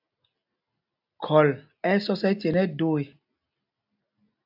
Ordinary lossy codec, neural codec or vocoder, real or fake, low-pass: AAC, 48 kbps; none; real; 5.4 kHz